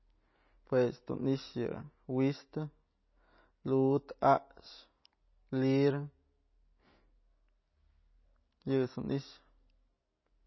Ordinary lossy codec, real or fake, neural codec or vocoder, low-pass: MP3, 24 kbps; real; none; 7.2 kHz